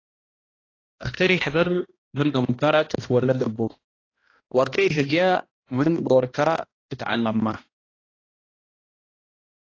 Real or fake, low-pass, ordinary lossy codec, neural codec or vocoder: fake; 7.2 kHz; AAC, 32 kbps; codec, 16 kHz, 1 kbps, X-Codec, HuBERT features, trained on balanced general audio